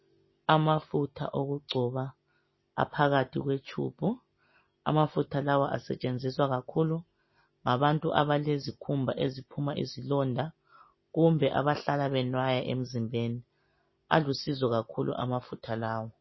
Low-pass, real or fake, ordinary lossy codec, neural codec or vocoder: 7.2 kHz; real; MP3, 24 kbps; none